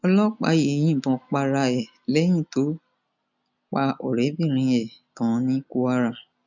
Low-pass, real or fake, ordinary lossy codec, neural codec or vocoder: 7.2 kHz; real; none; none